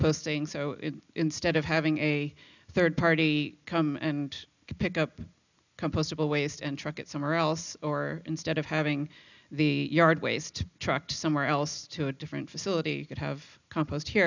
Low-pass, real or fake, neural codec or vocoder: 7.2 kHz; real; none